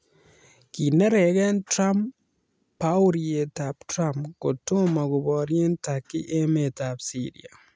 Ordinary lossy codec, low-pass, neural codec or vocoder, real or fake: none; none; none; real